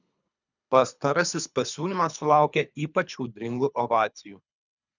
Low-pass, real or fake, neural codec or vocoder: 7.2 kHz; fake; codec, 24 kHz, 3 kbps, HILCodec